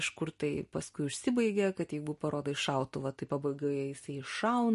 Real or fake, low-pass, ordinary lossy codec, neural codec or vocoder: real; 14.4 kHz; MP3, 48 kbps; none